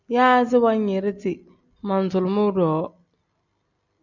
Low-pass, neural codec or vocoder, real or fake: 7.2 kHz; none; real